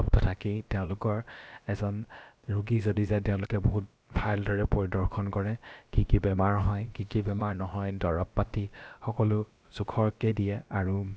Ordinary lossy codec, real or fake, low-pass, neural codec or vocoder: none; fake; none; codec, 16 kHz, about 1 kbps, DyCAST, with the encoder's durations